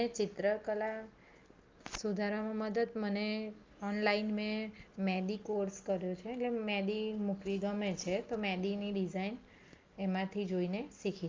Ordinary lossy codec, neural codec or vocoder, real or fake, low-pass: Opus, 32 kbps; none; real; 7.2 kHz